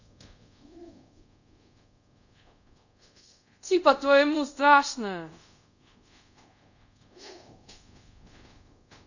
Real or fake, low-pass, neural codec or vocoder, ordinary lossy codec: fake; 7.2 kHz; codec, 24 kHz, 0.5 kbps, DualCodec; none